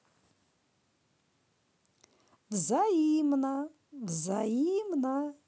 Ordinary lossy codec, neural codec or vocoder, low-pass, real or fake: none; none; none; real